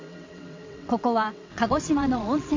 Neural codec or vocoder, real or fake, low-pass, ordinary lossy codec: vocoder, 22.05 kHz, 80 mel bands, WaveNeXt; fake; 7.2 kHz; MP3, 48 kbps